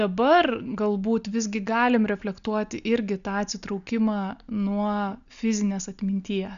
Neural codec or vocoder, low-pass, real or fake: none; 7.2 kHz; real